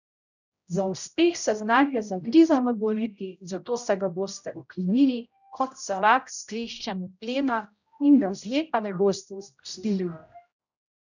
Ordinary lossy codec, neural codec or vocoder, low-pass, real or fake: none; codec, 16 kHz, 0.5 kbps, X-Codec, HuBERT features, trained on general audio; 7.2 kHz; fake